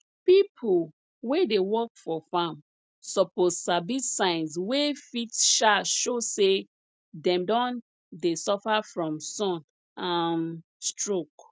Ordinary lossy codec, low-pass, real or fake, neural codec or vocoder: none; none; real; none